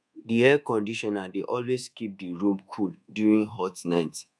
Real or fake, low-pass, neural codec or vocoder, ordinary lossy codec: fake; none; codec, 24 kHz, 1.2 kbps, DualCodec; none